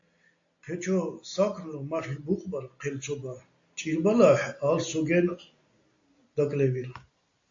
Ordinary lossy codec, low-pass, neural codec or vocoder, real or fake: AAC, 48 kbps; 7.2 kHz; none; real